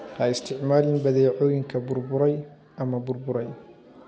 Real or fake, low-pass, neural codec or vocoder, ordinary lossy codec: real; none; none; none